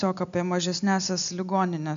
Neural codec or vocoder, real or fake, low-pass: none; real; 7.2 kHz